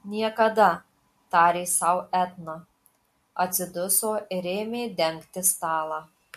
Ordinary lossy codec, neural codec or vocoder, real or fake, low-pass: MP3, 64 kbps; none; real; 14.4 kHz